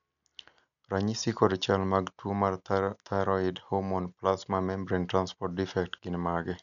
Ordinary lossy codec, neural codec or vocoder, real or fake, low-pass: none; none; real; 7.2 kHz